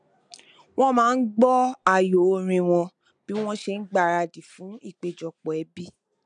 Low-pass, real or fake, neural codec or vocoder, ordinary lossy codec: 9.9 kHz; real; none; none